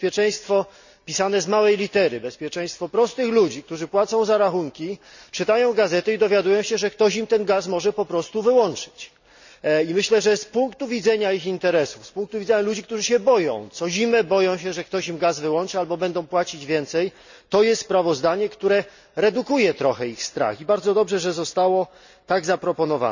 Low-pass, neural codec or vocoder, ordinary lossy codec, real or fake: 7.2 kHz; none; none; real